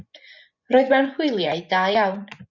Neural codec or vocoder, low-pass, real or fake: vocoder, 24 kHz, 100 mel bands, Vocos; 7.2 kHz; fake